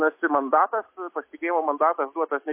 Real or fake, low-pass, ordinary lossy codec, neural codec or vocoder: real; 3.6 kHz; MP3, 24 kbps; none